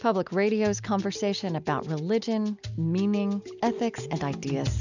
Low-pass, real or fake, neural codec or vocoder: 7.2 kHz; real; none